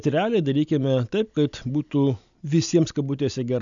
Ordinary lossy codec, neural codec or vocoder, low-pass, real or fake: MP3, 96 kbps; none; 7.2 kHz; real